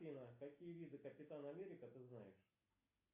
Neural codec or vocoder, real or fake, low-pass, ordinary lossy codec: none; real; 3.6 kHz; AAC, 32 kbps